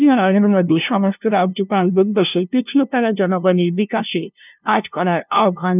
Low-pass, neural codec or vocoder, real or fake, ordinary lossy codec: 3.6 kHz; codec, 16 kHz, 0.5 kbps, FunCodec, trained on LibriTTS, 25 frames a second; fake; none